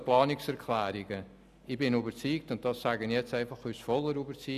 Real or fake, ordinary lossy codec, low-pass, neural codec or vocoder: real; none; 14.4 kHz; none